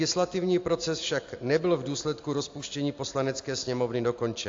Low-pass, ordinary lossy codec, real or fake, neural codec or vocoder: 7.2 kHz; AAC, 48 kbps; real; none